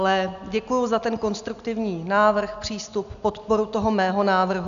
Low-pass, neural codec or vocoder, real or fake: 7.2 kHz; none; real